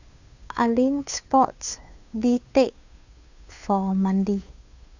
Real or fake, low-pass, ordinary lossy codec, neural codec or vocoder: fake; 7.2 kHz; none; codec, 16 kHz, 2 kbps, FunCodec, trained on Chinese and English, 25 frames a second